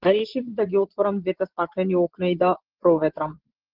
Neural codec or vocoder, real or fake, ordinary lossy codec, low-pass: vocoder, 24 kHz, 100 mel bands, Vocos; fake; Opus, 16 kbps; 5.4 kHz